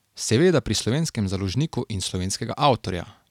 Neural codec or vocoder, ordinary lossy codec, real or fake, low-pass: none; none; real; 19.8 kHz